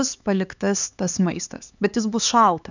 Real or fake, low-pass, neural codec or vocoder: fake; 7.2 kHz; codec, 16 kHz, 4 kbps, X-Codec, HuBERT features, trained on LibriSpeech